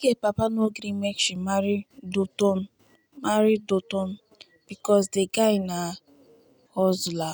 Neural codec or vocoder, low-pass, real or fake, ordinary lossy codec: none; none; real; none